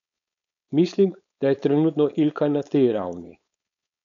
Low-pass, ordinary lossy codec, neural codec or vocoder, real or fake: 7.2 kHz; none; codec, 16 kHz, 4.8 kbps, FACodec; fake